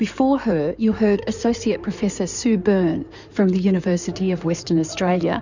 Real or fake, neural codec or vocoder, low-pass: fake; codec, 16 kHz in and 24 kHz out, 2.2 kbps, FireRedTTS-2 codec; 7.2 kHz